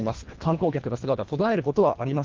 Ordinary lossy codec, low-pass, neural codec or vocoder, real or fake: Opus, 16 kbps; 7.2 kHz; codec, 24 kHz, 1.5 kbps, HILCodec; fake